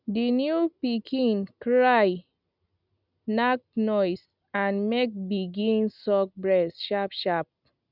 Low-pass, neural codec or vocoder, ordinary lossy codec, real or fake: 5.4 kHz; none; none; real